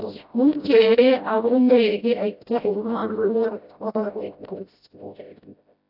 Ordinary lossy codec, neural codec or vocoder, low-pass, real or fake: AAC, 48 kbps; codec, 16 kHz, 0.5 kbps, FreqCodec, smaller model; 5.4 kHz; fake